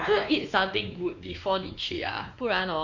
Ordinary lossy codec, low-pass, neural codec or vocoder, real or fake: none; 7.2 kHz; codec, 16 kHz, 2 kbps, X-Codec, WavLM features, trained on Multilingual LibriSpeech; fake